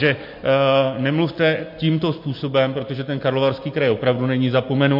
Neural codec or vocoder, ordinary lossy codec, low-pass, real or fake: none; MP3, 32 kbps; 5.4 kHz; real